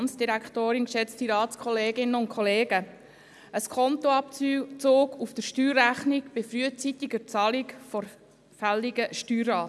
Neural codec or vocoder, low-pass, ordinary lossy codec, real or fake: none; none; none; real